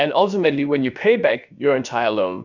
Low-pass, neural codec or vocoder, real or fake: 7.2 kHz; codec, 16 kHz, about 1 kbps, DyCAST, with the encoder's durations; fake